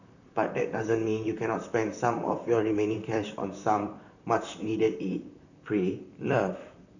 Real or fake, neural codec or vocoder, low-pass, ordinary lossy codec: fake; vocoder, 44.1 kHz, 128 mel bands, Pupu-Vocoder; 7.2 kHz; none